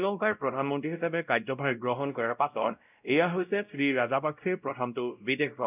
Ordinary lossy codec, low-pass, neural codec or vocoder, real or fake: none; 3.6 kHz; codec, 16 kHz, 0.5 kbps, X-Codec, WavLM features, trained on Multilingual LibriSpeech; fake